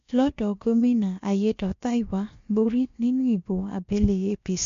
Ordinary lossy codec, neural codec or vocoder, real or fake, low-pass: MP3, 48 kbps; codec, 16 kHz, about 1 kbps, DyCAST, with the encoder's durations; fake; 7.2 kHz